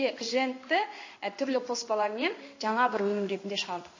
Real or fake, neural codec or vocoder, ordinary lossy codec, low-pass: fake; codec, 16 kHz in and 24 kHz out, 1 kbps, XY-Tokenizer; MP3, 32 kbps; 7.2 kHz